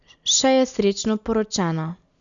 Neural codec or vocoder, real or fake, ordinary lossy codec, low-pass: none; real; none; 7.2 kHz